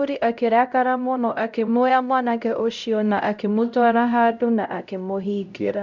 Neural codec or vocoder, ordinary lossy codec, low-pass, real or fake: codec, 16 kHz, 0.5 kbps, X-Codec, HuBERT features, trained on LibriSpeech; none; 7.2 kHz; fake